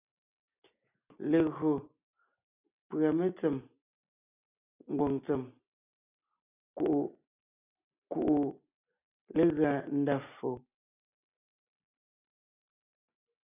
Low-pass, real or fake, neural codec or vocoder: 3.6 kHz; real; none